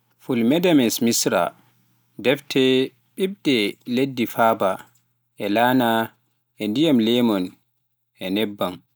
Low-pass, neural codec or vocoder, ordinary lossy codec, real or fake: none; none; none; real